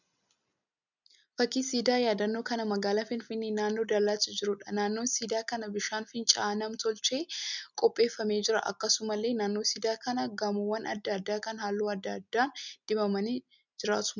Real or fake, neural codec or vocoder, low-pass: real; none; 7.2 kHz